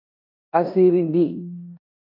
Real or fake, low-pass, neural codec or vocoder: fake; 5.4 kHz; codec, 16 kHz in and 24 kHz out, 0.9 kbps, LongCat-Audio-Codec, four codebook decoder